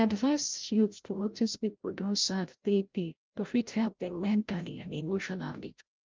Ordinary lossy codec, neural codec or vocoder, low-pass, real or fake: Opus, 32 kbps; codec, 16 kHz, 0.5 kbps, FreqCodec, larger model; 7.2 kHz; fake